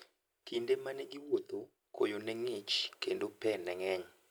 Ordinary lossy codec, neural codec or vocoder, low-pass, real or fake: none; none; none; real